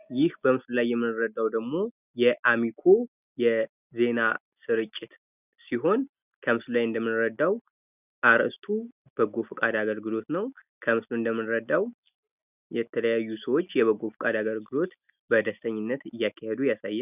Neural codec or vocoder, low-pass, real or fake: none; 3.6 kHz; real